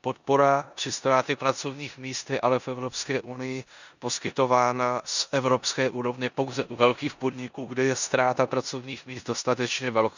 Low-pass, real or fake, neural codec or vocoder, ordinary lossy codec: 7.2 kHz; fake; codec, 16 kHz in and 24 kHz out, 0.9 kbps, LongCat-Audio-Codec, four codebook decoder; none